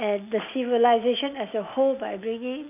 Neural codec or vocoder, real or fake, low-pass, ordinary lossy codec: none; real; 3.6 kHz; none